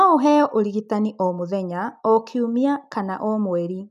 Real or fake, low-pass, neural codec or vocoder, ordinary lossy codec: real; 14.4 kHz; none; none